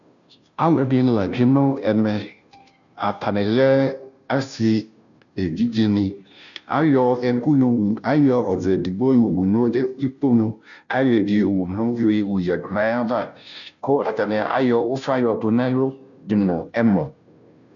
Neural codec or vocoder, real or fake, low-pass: codec, 16 kHz, 0.5 kbps, FunCodec, trained on Chinese and English, 25 frames a second; fake; 7.2 kHz